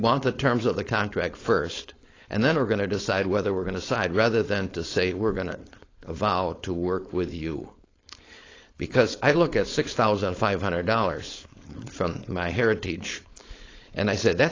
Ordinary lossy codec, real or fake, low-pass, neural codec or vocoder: AAC, 32 kbps; fake; 7.2 kHz; codec, 16 kHz, 4.8 kbps, FACodec